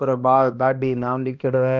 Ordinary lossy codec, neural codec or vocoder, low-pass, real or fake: none; codec, 16 kHz, 1 kbps, X-Codec, HuBERT features, trained on balanced general audio; 7.2 kHz; fake